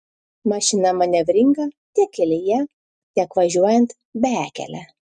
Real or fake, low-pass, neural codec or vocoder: real; 10.8 kHz; none